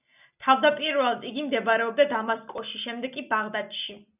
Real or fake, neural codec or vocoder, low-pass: real; none; 3.6 kHz